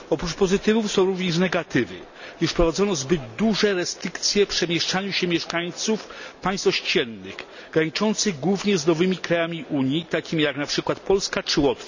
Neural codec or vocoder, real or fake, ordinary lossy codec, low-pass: none; real; none; 7.2 kHz